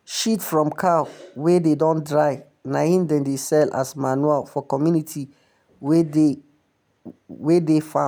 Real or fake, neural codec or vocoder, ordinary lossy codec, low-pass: real; none; none; none